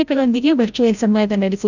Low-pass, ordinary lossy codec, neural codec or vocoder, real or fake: 7.2 kHz; none; codec, 16 kHz, 0.5 kbps, FreqCodec, larger model; fake